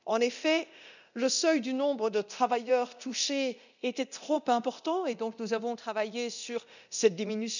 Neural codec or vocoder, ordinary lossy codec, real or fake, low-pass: codec, 24 kHz, 0.9 kbps, DualCodec; none; fake; 7.2 kHz